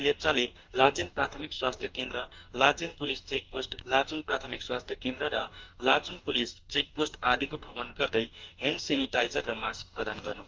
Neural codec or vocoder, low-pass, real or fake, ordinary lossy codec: codec, 44.1 kHz, 2.6 kbps, DAC; 7.2 kHz; fake; Opus, 24 kbps